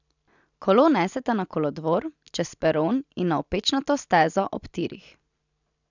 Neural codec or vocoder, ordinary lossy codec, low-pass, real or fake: none; none; 7.2 kHz; real